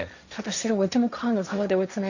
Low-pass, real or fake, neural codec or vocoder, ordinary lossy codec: 7.2 kHz; fake; codec, 16 kHz, 1.1 kbps, Voila-Tokenizer; none